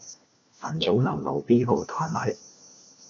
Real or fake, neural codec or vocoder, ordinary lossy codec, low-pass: fake; codec, 16 kHz, 1 kbps, FunCodec, trained on LibriTTS, 50 frames a second; MP3, 96 kbps; 7.2 kHz